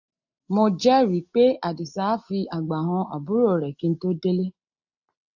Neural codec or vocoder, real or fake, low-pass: none; real; 7.2 kHz